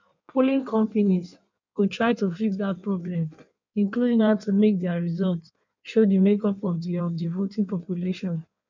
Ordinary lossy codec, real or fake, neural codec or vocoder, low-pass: none; fake; codec, 16 kHz in and 24 kHz out, 1.1 kbps, FireRedTTS-2 codec; 7.2 kHz